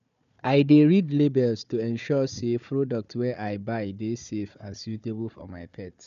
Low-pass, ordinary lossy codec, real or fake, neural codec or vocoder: 7.2 kHz; none; fake; codec, 16 kHz, 4 kbps, FunCodec, trained on Chinese and English, 50 frames a second